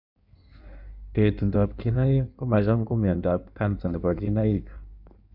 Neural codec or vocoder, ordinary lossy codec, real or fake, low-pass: codec, 16 kHz in and 24 kHz out, 1.1 kbps, FireRedTTS-2 codec; none; fake; 5.4 kHz